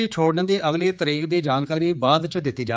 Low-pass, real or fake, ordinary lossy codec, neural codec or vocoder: none; fake; none; codec, 16 kHz, 4 kbps, X-Codec, HuBERT features, trained on general audio